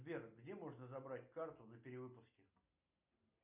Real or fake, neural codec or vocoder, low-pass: real; none; 3.6 kHz